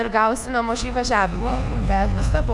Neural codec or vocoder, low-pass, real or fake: codec, 24 kHz, 1.2 kbps, DualCodec; 10.8 kHz; fake